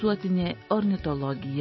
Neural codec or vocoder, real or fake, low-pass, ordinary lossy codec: none; real; 7.2 kHz; MP3, 24 kbps